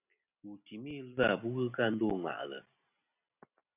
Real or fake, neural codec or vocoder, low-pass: real; none; 3.6 kHz